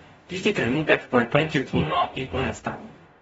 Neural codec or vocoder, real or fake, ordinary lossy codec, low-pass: codec, 44.1 kHz, 0.9 kbps, DAC; fake; AAC, 24 kbps; 19.8 kHz